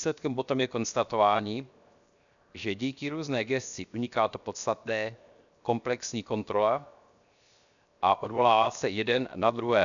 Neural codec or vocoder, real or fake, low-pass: codec, 16 kHz, 0.7 kbps, FocalCodec; fake; 7.2 kHz